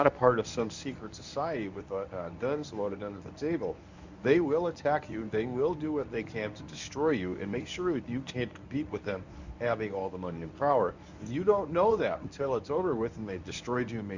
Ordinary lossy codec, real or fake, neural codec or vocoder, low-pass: Opus, 64 kbps; fake; codec, 24 kHz, 0.9 kbps, WavTokenizer, medium speech release version 1; 7.2 kHz